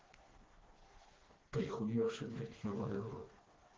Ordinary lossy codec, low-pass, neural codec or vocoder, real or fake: Opus, 16 kbps; 7.2 kHz; codec, 16 kHz, 2 kbps, FreqCodec, smaller model; fake